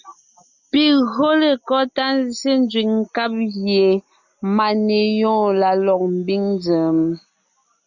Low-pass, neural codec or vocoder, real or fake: 7.2 kHz; none; real